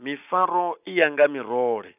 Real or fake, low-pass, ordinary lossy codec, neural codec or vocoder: real; 3.6 kHz; none; none